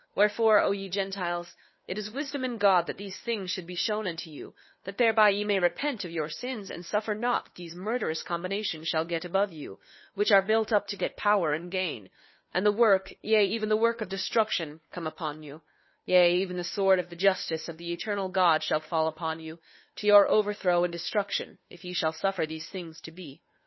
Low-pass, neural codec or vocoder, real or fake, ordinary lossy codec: 7.2 kHz; codec, 16 kHz, 2 kbps, FunCodec, trained on Chinese and English, 25 frames a second; fake; MP3, 24 kbps